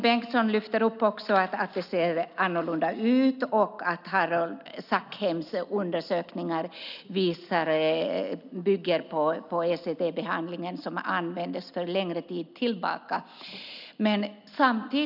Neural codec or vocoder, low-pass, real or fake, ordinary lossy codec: none; 5.4 kHz; real; none